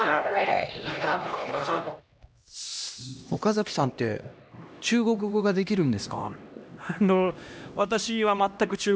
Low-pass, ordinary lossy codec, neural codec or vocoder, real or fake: none; none; codec, 16 kHz, 1 kbps, X-Codec, HuBERT features, trained on LibriSpeech; fake